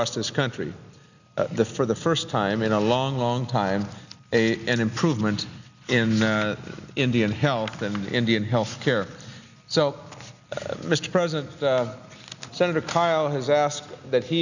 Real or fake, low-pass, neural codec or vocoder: real; 7.2 kHz; none